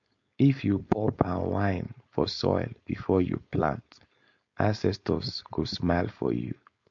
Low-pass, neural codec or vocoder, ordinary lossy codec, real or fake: 7.2 kHz; codec, 16 kHz, 4.8 kbps, FACodec; MP3, 48 kbps; fake